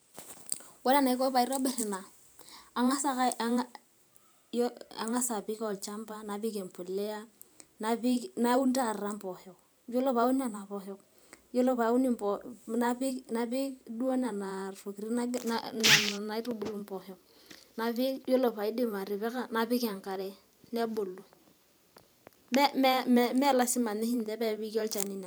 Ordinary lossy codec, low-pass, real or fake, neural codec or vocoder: none; none; fake; vocoder, 44.1 kHz, 128 mel bands every 512 samples, BigVGAN v2